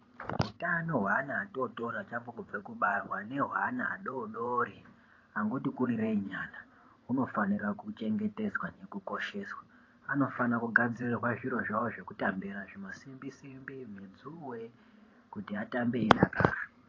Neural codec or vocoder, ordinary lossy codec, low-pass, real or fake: vocoder, 44.1 kHz, 128 mel bands every 512 samples, BigVGAN v2; AAC, 32 kbps; 7.2 kHz; fake